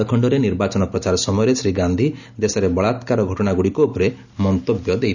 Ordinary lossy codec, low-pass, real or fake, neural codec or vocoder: none; 7.2 kHz; real; none